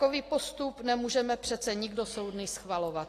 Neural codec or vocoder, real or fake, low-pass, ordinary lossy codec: none; real; 14.4 kHz; AAC, 48 kbps